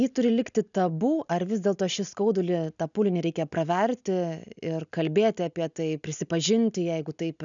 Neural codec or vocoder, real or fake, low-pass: none; real; 7.2 kHz